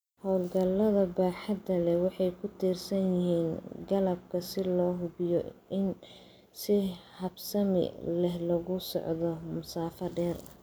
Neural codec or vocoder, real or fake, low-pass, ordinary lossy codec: none; real; none; none